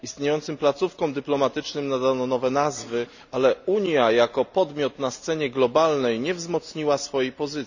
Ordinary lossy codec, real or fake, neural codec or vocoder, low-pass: none; real; none; 7.2 kHz